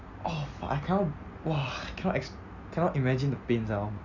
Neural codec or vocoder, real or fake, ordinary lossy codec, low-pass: none; real; Opus, 64 kbps; 7.2 kHz